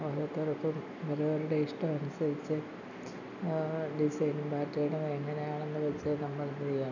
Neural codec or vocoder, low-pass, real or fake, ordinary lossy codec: none; 7.2 kHz; real; none